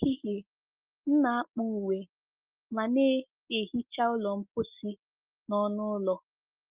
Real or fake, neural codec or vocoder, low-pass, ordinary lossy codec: real; none; 3.6 kHz; Opus, 32 kbps